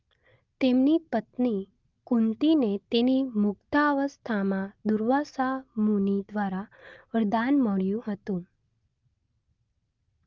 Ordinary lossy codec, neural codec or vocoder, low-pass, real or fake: Opus, 32 kbps; none; 7.2 kHz; real